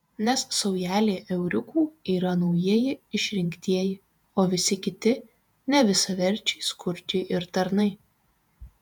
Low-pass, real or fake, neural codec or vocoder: 19.8 kHz; fake; vocoder, 48 kHz, 128 mel bands, Vocos